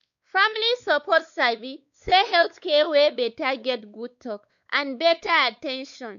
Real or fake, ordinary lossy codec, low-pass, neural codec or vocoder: fake; none; 7.2 kHz; codec, 16 kHz, 4 kbps, X-Codec, WavLM features, trained on Multilingual LibriSpeech